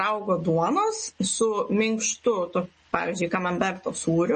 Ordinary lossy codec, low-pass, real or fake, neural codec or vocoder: MP3, 32 kbps; 9.9 kHz; real; none